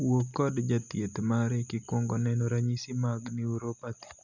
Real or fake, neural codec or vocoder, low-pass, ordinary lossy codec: real; none; 7.2 kHz; none